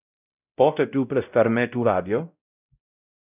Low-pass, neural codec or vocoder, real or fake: 3.6 kHz; codec, 16 kHz, 0.5 kbps, X-Codec, WavLM features, trained on Multilingual LibriSpeech; fake